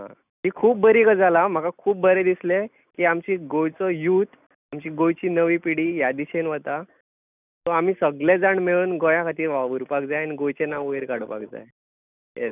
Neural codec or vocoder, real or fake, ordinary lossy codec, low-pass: none; real; none; 3.6 kHz